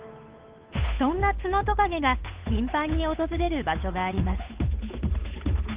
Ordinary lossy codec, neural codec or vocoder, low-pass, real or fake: Opus, 32 kbps; codec, 16 kHz, 8 kbps, FunCodec, trained on Chinese and English, 25 frames a second; 3.6 kHz; fake